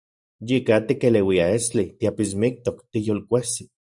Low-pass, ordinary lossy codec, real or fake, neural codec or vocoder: 10.8 kHz; Opus, 64 kbps; fake; vocoder, 44.1 kHz, 128 mel bands every 512 samples, BigVGAN v2